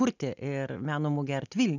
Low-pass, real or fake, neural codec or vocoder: 7.2 kHz; real; none